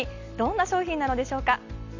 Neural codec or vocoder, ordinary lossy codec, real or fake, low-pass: none; none; real; 7.2 kHz